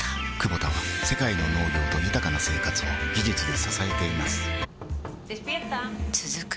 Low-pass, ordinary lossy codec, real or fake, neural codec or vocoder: none; none; real; none